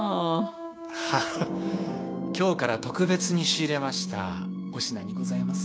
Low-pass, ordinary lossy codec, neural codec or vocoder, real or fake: none; none; codec, 16 kHz, 6 kbps, DAC; fake